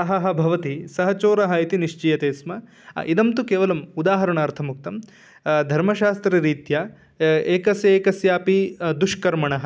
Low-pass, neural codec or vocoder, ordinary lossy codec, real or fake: none; none; none; real